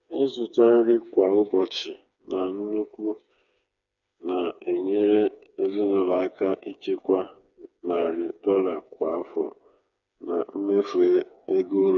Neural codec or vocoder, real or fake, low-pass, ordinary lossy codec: codec, 16 kHz, 4 kbps, FreqCodec, smaller model; fake; 7.2 kHz; none